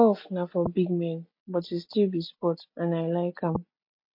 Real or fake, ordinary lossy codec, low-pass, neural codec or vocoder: real; MP3, 32 kbps; 5.4 kHz; none